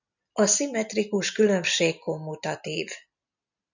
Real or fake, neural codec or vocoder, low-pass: real; none; 7.2 kHz